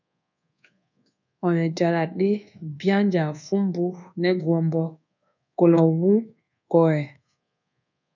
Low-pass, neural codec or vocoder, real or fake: 7.2 kHz; codec, 24 kHz, 1.2 kbps, DualCodec; fake